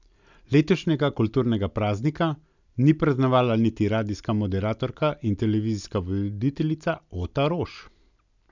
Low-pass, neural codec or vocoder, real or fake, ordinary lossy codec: 7.2 kHz; none; real; none